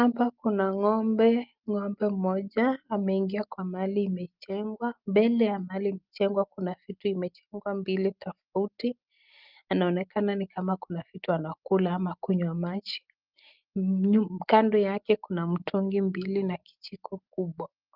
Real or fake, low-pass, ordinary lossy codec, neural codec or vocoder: real; 5.4 kHz; Opus, 24 kbps; none